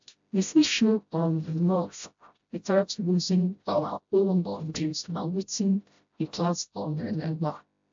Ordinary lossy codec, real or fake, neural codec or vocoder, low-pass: AAC, 64 kbps; fake; codec, 16 kHz, 0.5 kbps, FreqCodec, smaller model; 7.2 kHz